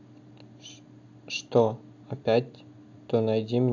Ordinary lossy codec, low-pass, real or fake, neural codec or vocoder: AAC, 48 kbps; 7.2 kHz; real; none